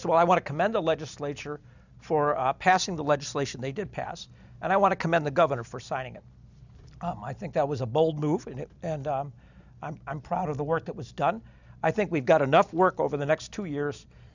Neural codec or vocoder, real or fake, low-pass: none; real; 7.2 kHz